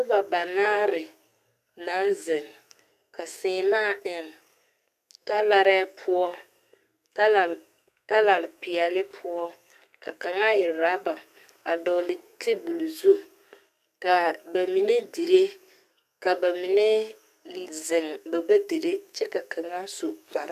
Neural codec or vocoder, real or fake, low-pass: codec, 32 kHz, 1.9 kbps, SNAC; fake; 14.4 kHz